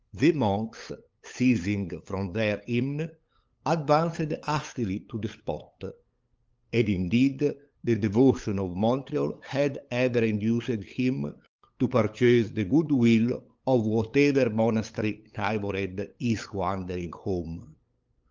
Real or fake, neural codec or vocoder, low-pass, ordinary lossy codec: fake; codec, 16 kHz, 8 kbps, FunCodec, trained on LibriTTS, 25 frames a second; 7.2 kHz; Opus, 24 kbps